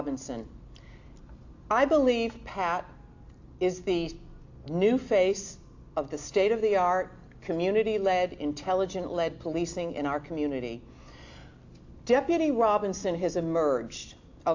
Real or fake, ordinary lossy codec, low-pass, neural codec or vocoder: real; AAC, 48 kbps; 7.2 kHz; none